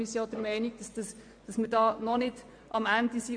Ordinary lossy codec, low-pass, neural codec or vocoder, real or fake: MP3, 64 kbps; 9.9 kHz; vocoder, 24 kHz, 100 mel bands, Vocos; fake